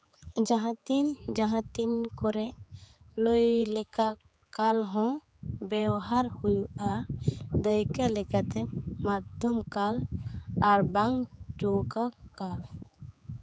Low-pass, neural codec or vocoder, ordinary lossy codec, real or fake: none; codec, 16 kHz, 4 kbps, X-Codec, HuBERT features, trained on general audio; none; fake